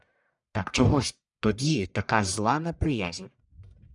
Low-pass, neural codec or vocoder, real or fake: 10.8 kHz; codec, 44.1 kHz, 1.7 kbps, Pupu-Codec; fake